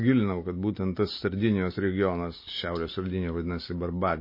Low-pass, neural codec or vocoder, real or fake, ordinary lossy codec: 5.4 kHz; none; real; MP3, 24 kbps